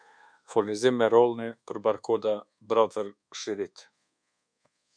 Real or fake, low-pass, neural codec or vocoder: fake; 9.9 kHz; codec, 24 kHz, 1.2 kbps, DualCodec